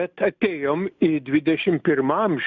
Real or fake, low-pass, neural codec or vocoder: real; 7.2 kHz; none